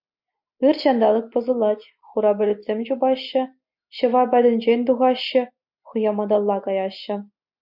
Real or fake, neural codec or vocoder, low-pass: real; none; 5.4 kHz